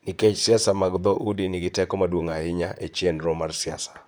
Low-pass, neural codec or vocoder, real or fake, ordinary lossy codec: none; vocoder, 44.1 kHz, 128 mel bands, Pupu-Vocoder; fake; none